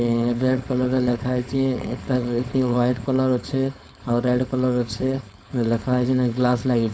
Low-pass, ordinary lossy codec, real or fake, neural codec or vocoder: none; none; fake; codec, 16 kHz, 4.8 kbps, FACodec